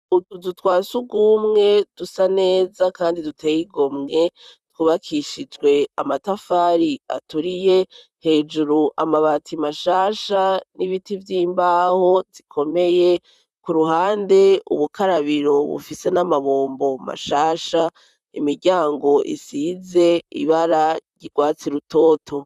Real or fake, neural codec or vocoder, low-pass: fake; vocoder, 44.1 kHz, 128 mel bands, Pupu-Vocoder; 14.4 kHz